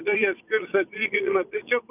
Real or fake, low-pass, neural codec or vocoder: fake; 3.6 kHz; vocoder, 44.1 kHz, 80 mel bands, Vocos